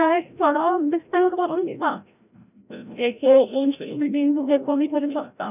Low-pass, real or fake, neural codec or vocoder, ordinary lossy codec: 3.6 kHz; fake; codec, 16 kHz, 0.5 kbps, FreqCodec, larger model; none